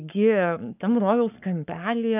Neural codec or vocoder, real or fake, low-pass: codec, 44.1 kHz, 7.8 kbps, Pupu-Codec; fake; 3.6 kHz